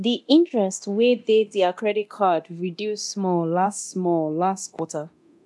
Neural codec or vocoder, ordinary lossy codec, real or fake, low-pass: codec, 24 kHz, 0.9 kbps, DualCodec; none; fake; none